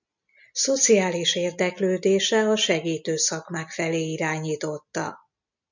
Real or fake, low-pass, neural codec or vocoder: real; 7.2 kHz; none